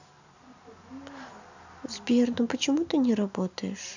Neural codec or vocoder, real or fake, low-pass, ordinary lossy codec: none; real; 7.2 kHz; none